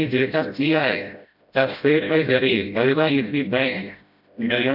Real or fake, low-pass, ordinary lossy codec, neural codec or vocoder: fake; 5.4 kHz; none; codec, 16 kHz, 0.5 kbps, FreqCodec, smaller model